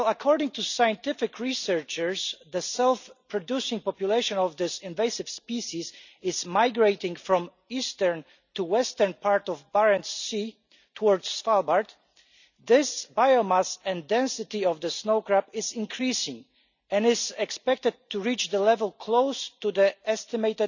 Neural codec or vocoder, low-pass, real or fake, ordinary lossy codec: none; 7.2 kHz; real; none